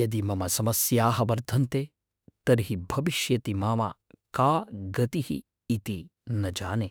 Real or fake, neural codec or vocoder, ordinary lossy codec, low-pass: fake; autoencoder, 48 kHz, 32 numbers a frame, DAC-VAE, trained on Japanese speech; none; none